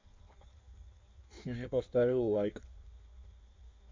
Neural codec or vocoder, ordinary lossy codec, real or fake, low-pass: codec, 16 kHz in and 24 kHz out, 1.1 kbps, FireRedTTS-2 codec; MP3, 64 kbps; fake; 7.2 kHz